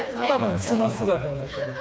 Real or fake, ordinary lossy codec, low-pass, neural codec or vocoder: fake; none; none; codec, 16 kHz, 2 kbps, FreqCodec, smaller model